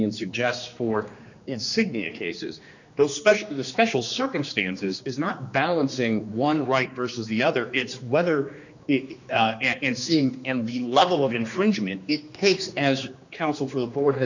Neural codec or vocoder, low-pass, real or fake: codec, 16 kHz, 2 kbps, X-Codec, HuBERT features, trained on general audio; 7.2 kHz; fake